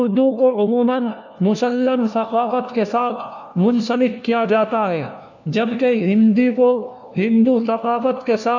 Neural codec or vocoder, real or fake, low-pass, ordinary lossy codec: codec, 16 kHz, 1 kbps, FunCodec, trained on LibriTTS, 50 frames a second; fake; 7.2 kHz; none